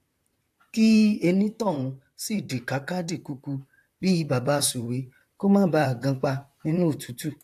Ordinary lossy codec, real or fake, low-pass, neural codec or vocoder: AAC, 64 kbps; fake; 14.4 kHz; vocoder, 44.1 kHz, 128 mel bands, Pupu-Vocoder